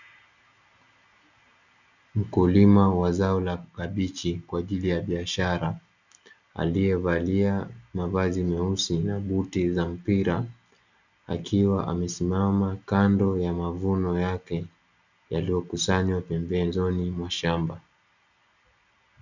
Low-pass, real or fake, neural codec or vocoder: 7.2 kHz; real; none